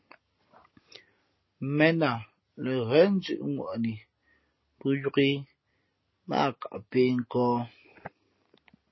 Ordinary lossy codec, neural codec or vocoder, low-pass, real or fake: MP3, 24 kbps; none; 7.2 kHz; real